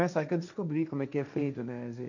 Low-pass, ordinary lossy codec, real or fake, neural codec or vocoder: 7.2 kHz; none; fake; codec, 16 kHz, 1.1 kbps, Voila-Tokenizer